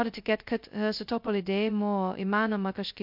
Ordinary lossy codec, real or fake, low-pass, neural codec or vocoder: MP3, 48 kbps; fake; 5.4 kHz; codec, 16 kHz, 0.2 kbps, FocalCodec